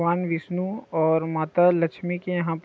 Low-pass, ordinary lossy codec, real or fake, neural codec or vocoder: none; none; real; none